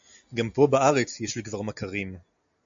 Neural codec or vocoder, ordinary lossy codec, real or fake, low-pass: none; AAC, 64 kbps; real; 7.2 kHz